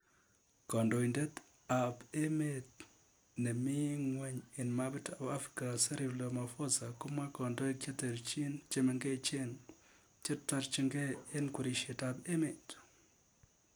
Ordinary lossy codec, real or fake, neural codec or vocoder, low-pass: none; real; none; none